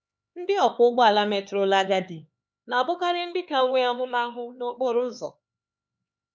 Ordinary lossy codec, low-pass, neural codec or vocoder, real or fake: none; none; codec, 16 kHz, 4 kbps, X-Codec, HuBERT features, trained on LibriSpeech; fake